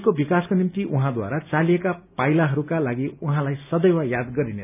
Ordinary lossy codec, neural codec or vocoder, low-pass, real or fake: none; none; 3.6 kHz; real